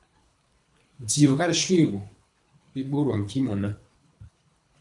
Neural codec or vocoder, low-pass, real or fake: codec, 24 kHz, 3 kbps, HILCodec; 10.8 kHz; fake